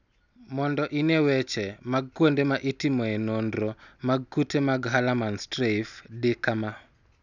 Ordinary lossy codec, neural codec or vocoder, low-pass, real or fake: none; none; 7.2 kHz; real